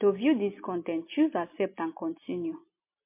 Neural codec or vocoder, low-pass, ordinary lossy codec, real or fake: none; 3.6 kHz; MP3, 24 kbps; real